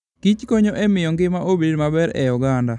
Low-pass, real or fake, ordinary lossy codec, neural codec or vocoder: 10.8 kHz; real; none; none